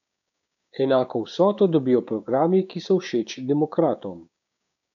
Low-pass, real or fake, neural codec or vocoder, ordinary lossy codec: 7.2 kHz; fake; codec, 16 kHz, 6 kbps, DAC; none